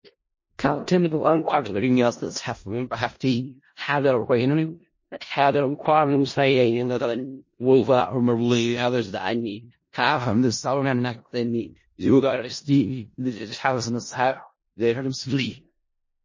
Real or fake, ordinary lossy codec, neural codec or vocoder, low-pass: fake; MP3, 32 kbps; codec, 16 kHz in and 24 kHz out, 0.4 kbps, LongCat-Audio-Codec, four codebook decoder; 7.2 kHz